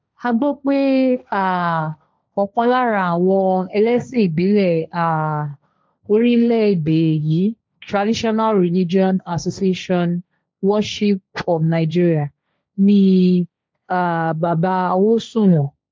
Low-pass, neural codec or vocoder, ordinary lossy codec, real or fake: none; codec, 16 kHz, 1.1 kbps, Voila-Tokenizer; none; fake